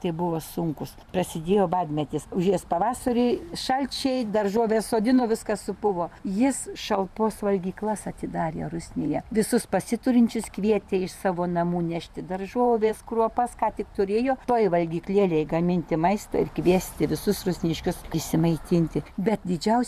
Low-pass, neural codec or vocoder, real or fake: 14.4 kHz; vocoder, 44.1 kHz, 128 mel bands every 512 samples, BigVGAN v2; fake